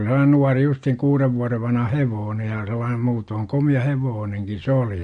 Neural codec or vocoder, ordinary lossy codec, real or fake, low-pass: none; MP3, 48 kbps; real; 9.9 kHz